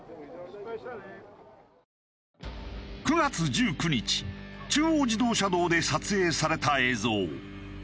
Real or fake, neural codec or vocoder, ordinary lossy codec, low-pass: real; none; none; none